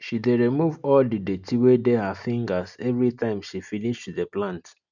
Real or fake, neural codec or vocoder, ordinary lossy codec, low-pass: real; none; none; 7.2 kHz